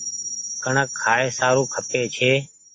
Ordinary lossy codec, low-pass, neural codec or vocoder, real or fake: AAC, 48 kbps; 9.9 kHz; none; real